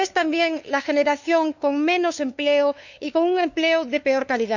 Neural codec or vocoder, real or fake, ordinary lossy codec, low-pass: codec, 16 kHz, 2 kbps, FunCodec, trained on LibriTTS, 25 frames a second; fake; none; 7.2 kHz